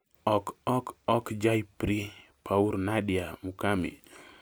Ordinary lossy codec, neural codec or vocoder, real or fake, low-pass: none; none; real; none